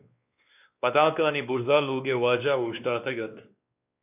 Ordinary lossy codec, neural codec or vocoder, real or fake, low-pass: AAC, 32 kbps; codec, 16 kHz, 2 kbps, X-Codec, WavLM features, trained on Multilingual LibriSpeech; fake; 3.6 kHz